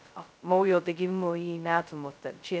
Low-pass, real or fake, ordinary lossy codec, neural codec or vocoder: none; fake; none; codec, 16 kHz, 0.2 kbps, FocalCodec